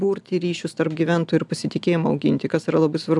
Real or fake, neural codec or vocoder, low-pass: real; none; 10.8 kHz